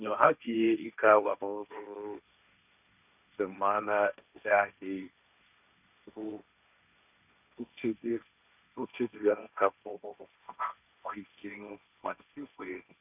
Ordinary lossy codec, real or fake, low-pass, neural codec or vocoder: none; fake; 3.6 kHz; codec, 16 kHz, 1.1 kbps, Voila-Tokenizer